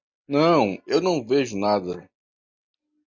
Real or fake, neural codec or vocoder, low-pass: real; none; 7.2 kHz